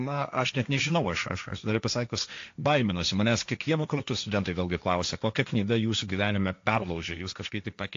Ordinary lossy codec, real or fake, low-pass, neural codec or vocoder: AAC, 48 kbps; fake; 7.2 kHz; codec, 16 kHz, 1.1 kbps, Voila-Tokenizer